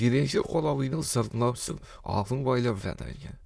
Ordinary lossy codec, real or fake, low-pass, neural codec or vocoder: none; fake; none; autoencoder, 22.05 kHz, a latent of 192 numbers a frame, VITS, trained on many speakers